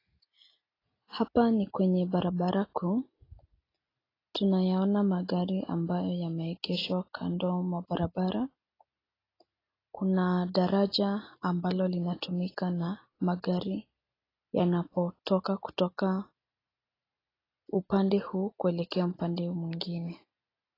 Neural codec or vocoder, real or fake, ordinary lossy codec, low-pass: none; real; AAC, 24 kbps; 5.4 kHz